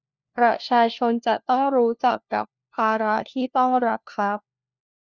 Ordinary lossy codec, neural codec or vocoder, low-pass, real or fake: Opus, 64 kbps; codec, 16 kHz, 1 kbps, FunCodec, trained on LibriTTS, 50 frames a second; 7.2 kHz; fake